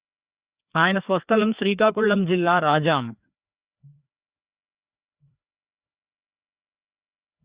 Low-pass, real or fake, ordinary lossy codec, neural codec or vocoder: 3.6 kHz; fake; Opus, 64 kbps; codec, 16 kHz, 2 kbps, FreqCodec, larger model